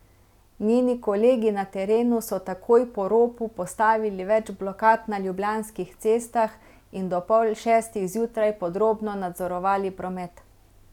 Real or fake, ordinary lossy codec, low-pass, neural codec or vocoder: real; none; 19.8 kHz; none